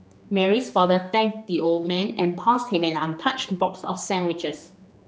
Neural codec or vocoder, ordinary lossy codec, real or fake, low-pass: codec, 16 kHz, 2 kbps, X-Codec, HuBERT features, trained on general audio; none; fake; none